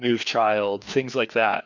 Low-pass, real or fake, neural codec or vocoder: 7.2 kHz; fake; codec, 16 kHz, 2 kbps, FreqCodec, larger model